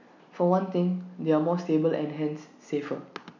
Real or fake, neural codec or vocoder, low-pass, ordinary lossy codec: real; none; 7.2 kHz; none